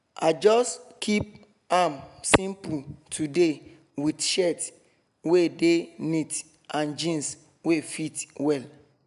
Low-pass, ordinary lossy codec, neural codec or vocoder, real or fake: 10.8 kHz; none; none; real